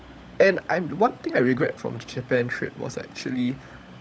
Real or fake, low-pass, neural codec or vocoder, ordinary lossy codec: fake; none; codec, 16 kHz, 16 kbps, FunCodec, trained on LibriTTS, 50 frames a second; none